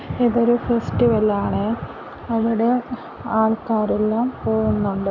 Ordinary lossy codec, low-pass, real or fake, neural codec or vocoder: none; 7.2 kHz; real; none